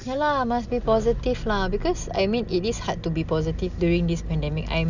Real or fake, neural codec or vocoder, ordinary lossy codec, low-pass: real; none; none; 7.2 kHz